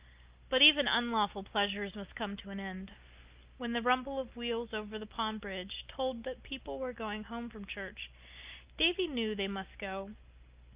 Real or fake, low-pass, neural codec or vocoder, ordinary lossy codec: real; 3.6 kHz; none; Opus, 32 kbps